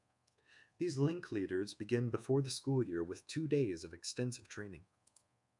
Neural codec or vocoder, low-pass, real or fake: codec, 24 kHz, 1.2 kbps, DualCodec; 10.8 kHz; fake